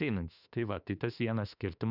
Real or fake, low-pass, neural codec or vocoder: fake; 5.4 kHz; autoencoder, 48 kHz, 32 numbers a frame, DAC-VAE, trained on Japanese speech